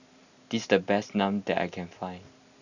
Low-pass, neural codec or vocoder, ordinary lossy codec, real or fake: 7.2 kHz; none; none; real